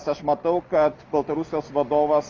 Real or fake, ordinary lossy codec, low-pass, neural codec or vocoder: real; Opus, 24 kbps; 7.2 kHz; none